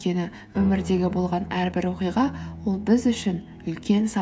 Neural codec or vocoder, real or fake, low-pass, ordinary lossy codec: codec, 16 kHz, 16 kbps, FreqCodec, smaller model; fake; none; none